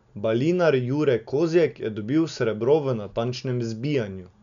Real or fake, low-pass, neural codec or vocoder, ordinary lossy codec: real; 7.2 kHz; none; none